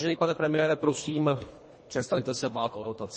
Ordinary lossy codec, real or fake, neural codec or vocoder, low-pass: MP3, 32 kbps; fake; codec, 24 kHz, 1.5 kbps, HILCodec; 10.8 kHz